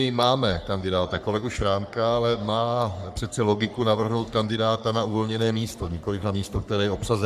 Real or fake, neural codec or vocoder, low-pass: fake; codec, 44.1 kHz, 3.4 kbps, Pupu-Codec; 14.4 kHz